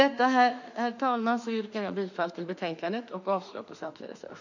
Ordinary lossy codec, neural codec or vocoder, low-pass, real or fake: none; autoencoder, 48 kHz, 32 numbers a frame, DAC-VAE, trained on Japanese speech; 7.2 kHz; fake